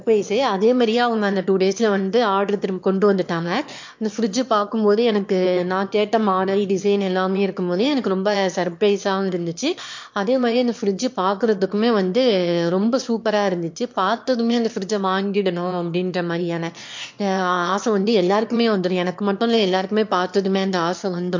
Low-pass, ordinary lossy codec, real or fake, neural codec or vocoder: 7.2 kHz; MP3, 48 kbps; fake; autoencoder, 22.05 kHz, a latent of 192 numbers a frame, VITS, trained on one speaker